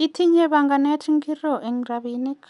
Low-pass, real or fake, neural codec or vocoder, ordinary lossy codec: 10.8 kHz; real; none; none